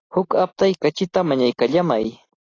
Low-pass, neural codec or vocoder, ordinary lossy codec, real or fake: 7.2 kHz; none; AAC, 32 kbps; real